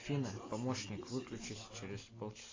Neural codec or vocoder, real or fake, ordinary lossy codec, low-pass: none; real; AAC, 32 kbps; 7.2 kHz